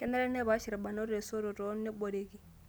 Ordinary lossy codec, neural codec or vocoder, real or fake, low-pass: none; none; real; none